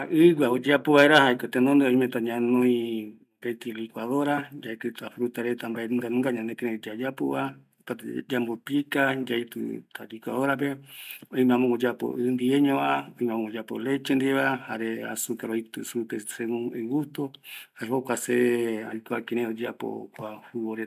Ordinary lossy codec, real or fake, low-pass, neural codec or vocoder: none; real; 14.4 kHz; none